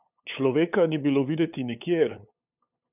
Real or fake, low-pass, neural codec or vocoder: fake; 3.6 kHz; codec, 16 kHz, 8 kbps, FunCodec, trained on LibriTTS, 25 frames a second